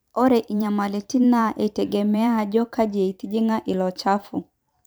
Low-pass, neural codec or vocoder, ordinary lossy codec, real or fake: none; vocoder, 44.1 kHz, 128 mel bands every 512 samples, BigVGAN v2; none; fake